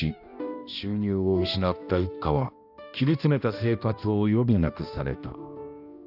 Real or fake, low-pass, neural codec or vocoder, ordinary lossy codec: fake; 5.4 kHz; codec, 16 kHz, 1 kbps, X-Codec, HuBERT features, trained on balanced general audio; none